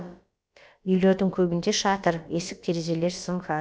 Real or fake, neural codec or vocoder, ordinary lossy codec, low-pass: fake; codec, 16 kHz, about 1 kbps, DyCAST, with the encoder's durations; none; none